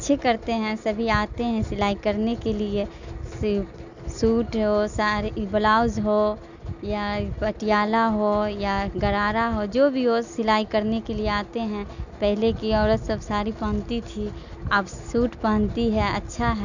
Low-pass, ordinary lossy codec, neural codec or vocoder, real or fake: 7.2 kHz; none; none; real